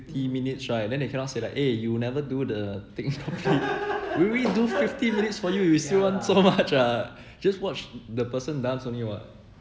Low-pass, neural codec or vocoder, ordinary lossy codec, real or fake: none; none; none; real